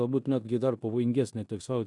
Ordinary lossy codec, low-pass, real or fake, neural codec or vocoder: AAC, 64 kbps; 10.8 kHz; fake; codec, 16 kHz in and 24 kHz out, 0.9 kbps, LongCat-Audio-Codec, four codebook decoder